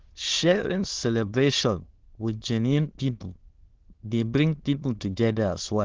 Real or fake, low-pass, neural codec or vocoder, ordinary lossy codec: fake; 7.2 kHz; autoencoder, 22.05 kHz, a latent of 192 numbers a frame, VITS, trained on many speakers; Opus, 16 kbps